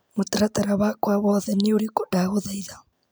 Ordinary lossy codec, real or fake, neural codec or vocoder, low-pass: none; real; none; none